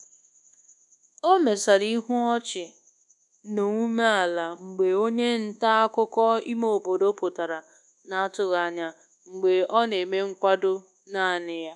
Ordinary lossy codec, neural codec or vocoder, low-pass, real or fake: none; codec, 24 kHz, 1.2 kbps, DualCodec; 10.8 kHz; fake